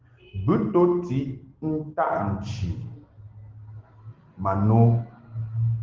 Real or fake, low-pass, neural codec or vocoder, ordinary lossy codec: real; 7.2 kHz; none; Opus, 16 kbps